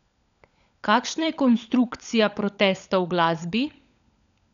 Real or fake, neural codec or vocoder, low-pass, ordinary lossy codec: fake; codec, 16 kHz, 16 kbps, FunCodec, trained on LibriTTS, 50 frames a second; 7.2 kHz; none